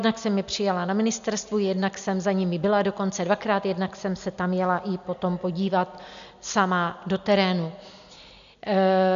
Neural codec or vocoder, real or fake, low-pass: none; real; 7.2 kHz